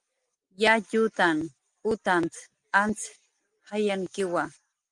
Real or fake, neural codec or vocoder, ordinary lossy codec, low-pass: real; none; Opus, 32 kbps; 10.8 kHz